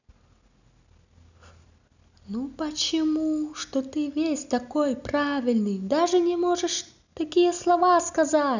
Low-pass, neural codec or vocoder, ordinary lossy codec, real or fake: 7.2 kHz; none; none; real